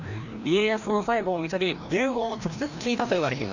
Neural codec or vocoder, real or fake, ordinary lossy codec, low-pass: codec, 16 kHz, 1 kbps, FreqCodec, larger model; fake; none; 7.2 kHz